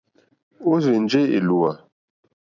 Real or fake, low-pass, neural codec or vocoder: fake; 7.2 kHz; vocoder, 44.1 kHz, 128 mel bands every 256 samples, BigVGAN v2